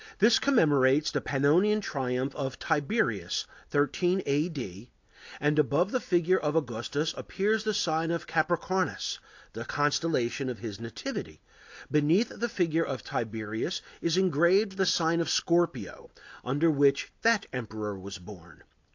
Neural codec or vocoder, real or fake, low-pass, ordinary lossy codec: none; real; 7.2 kHz; AAC, 48 kbps